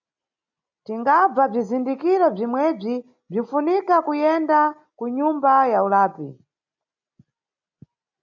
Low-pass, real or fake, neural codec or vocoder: 7.2 kHz; real; none